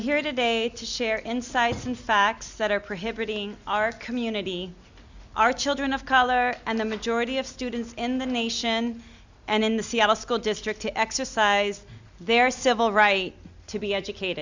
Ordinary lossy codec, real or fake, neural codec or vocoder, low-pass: Opus, 64 kbps; real; none; 7.2 kHz